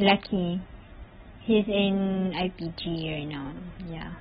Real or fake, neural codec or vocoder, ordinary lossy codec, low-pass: real; none; AAC, 16 kbps; 19.8 kHz